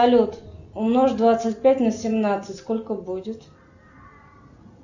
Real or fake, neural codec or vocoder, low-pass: real; none; 7.2 kHz